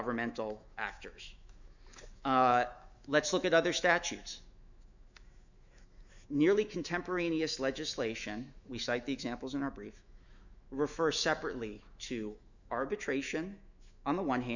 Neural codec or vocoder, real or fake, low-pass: autoencoder, 48 kHz, 128 numbers a frame, DAC-VAE, trained on Japanese speech; fake; 7.2 kHz